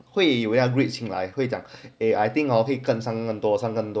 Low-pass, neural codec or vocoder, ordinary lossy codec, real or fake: none; none; none; real